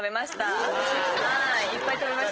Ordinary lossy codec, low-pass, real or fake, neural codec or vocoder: Opus, 16 kbps; 7.2 kHz; real; none